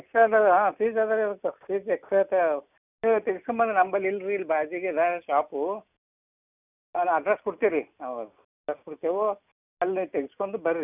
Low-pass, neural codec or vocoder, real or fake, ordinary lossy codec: 3.6 kHz; none; real; none